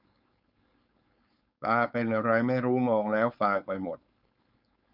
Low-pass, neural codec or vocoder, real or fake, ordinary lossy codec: 5.4 kHz; codec, 16 kHz, 4.8 kbps, FACodec; fake; none